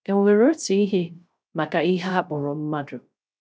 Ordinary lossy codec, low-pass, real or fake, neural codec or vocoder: none; none; fake; codec, 16 kHz, 0.3 kbps, FocalCodec